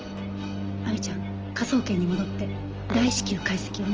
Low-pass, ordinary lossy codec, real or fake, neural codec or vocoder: 7.2 kHz; Opus, 24 kbps; real; none